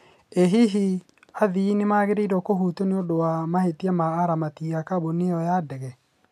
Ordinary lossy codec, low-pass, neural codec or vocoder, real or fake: none; 14.4 kHz; none; real